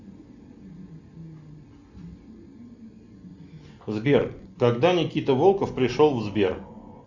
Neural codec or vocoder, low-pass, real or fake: none; 7.2 kHz; real